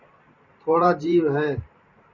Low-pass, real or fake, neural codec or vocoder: 7.2 kHz; fake; vocoder, 44.1 kHz, 128 mel bands every 512 samples, BigVGAN v2